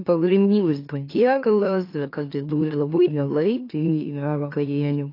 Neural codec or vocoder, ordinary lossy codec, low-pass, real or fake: autoencoder, 44.1 kHz, a latent of 192 numbers a frame, MeloTTS; AAC, 32 kbps; 5.4 kHz; fake